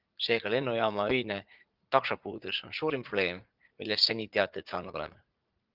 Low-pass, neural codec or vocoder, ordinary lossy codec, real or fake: 5.4 kHz; vocoder, 44.1 kHz, 80 mel bands, Vocos; Opus, 32 kbps; fake